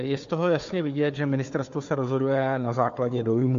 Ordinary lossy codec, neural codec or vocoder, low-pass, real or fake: MP3, 48 kbps; codec, 16 kHz, 4 kbps, FunCodec, trained on Chinese and English, 50 frames a second; 7.2 kHz; fake